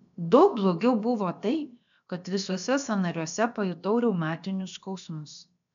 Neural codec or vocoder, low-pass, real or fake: codec, 16 kHz, about 1 kbps, DyCAST, with the encoder's durations; 7.2 kHz; fake